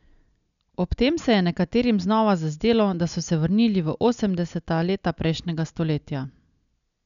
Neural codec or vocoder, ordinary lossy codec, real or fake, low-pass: none; none; real; 7.2 kHz